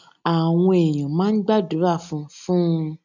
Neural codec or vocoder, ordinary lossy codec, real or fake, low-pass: none; none; real; 7.2 kHz